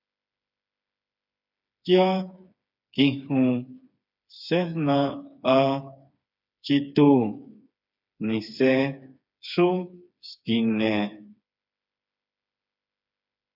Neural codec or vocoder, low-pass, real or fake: codec, 16 kHz, 4 kbps, FreqCodec, smaller model; 5.4 kHz; fake